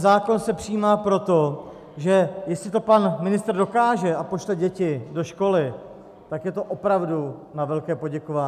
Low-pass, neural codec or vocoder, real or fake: 14.4 kHz; none; real